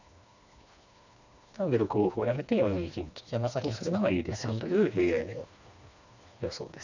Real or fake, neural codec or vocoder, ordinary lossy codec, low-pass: fake; codec, 16 kHz, 2 kbps, FreqCodec, smaller model; none; 7.2 kHz